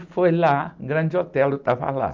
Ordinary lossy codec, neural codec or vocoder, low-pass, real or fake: Opus, 32 kbps; none; 7.2 kHz; real